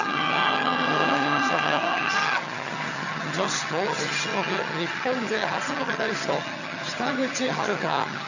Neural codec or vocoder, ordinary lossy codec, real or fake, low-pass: vocoder, 22.05 kHz, 80 mel bands, HiFi-GAN; none; fake; 7.2 kHz